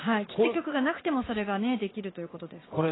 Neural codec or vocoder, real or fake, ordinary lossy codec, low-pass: vocoder, 44.1 kHz, 80 mel bands, Vocos; fake; AAC, 16 kbps; 7.2 kHz